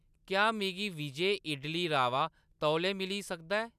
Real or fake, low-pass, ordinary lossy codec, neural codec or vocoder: real; 14.4 kHz; none; none